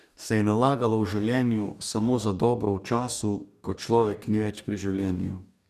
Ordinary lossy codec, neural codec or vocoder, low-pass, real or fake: none; codec, 44.1 kHz, 2.6 kbps, DAC; 14.4 kHz; fake